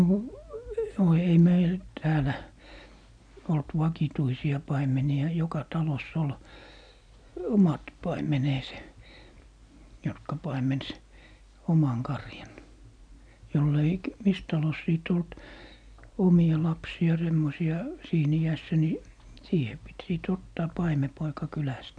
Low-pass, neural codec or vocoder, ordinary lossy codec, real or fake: 9.9 kHz; none; none; real